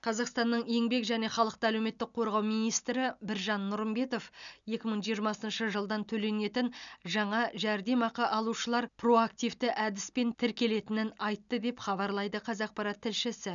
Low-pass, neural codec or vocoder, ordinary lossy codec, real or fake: 7.2 kHz; none; none; real